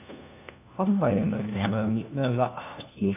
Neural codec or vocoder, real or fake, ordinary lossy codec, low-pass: codec, 16 kHz, 1 kbps, FunCodec, trained on LibriTTS, 50 frames a second; fake; none; 3.6 kHz